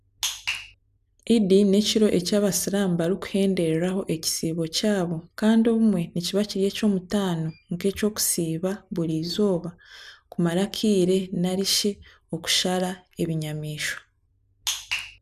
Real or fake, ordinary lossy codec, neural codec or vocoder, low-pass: real; none; none; 14.4 kHz